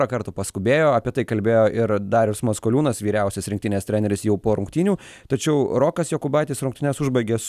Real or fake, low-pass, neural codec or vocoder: real; 14.4 kHz; none